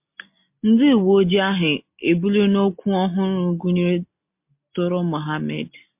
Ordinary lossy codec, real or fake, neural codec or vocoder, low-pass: MP3, 32 kbps; real; none; 3.6 kHz